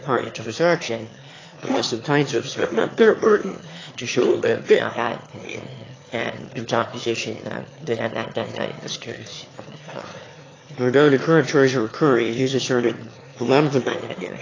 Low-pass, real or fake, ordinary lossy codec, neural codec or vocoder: 7.2 kHz; fake; AAC, 32 kbps; autoencoder, 22.05 kHz, a latent of 192 numbers a frame, VITS, trained on one speaker